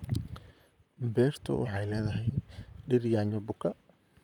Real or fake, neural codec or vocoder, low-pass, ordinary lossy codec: fake; vocoder, 44.1 kHz, 128 mel bands, Pupu-Vocoder; 19.8 kHz; none